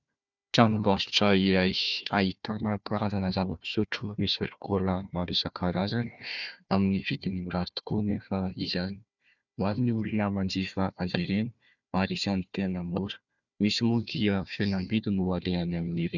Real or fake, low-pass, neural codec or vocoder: fake; 7.2 kHz; codec, 16 kHz, 1 kbps, FunCodec, trained on Chinese and English, 50 frames a second